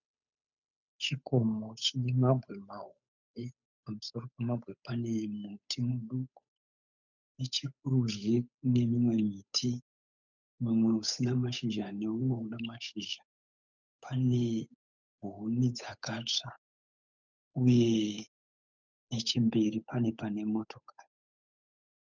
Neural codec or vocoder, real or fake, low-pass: codec, 16 kHz, 8 kbps, FunCodec, trained on Chinese and English, 25 frames a second; fake; 7.2 kHz